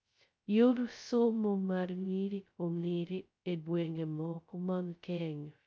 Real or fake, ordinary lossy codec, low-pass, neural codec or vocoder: fake; none; none; codec, 16 kHz, 0.2 kbps, FocalCodec